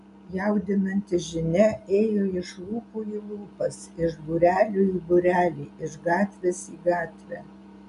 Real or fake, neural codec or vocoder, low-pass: real; none; 10.8 kHz